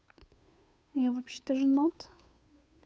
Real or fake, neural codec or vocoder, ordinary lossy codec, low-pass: fake; codec, 16 kHz, 2 kbps, FunCodec, trained on Chinese and English, 25 frames a second; none; none